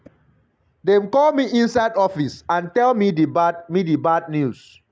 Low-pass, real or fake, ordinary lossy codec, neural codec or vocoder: none; real; none; none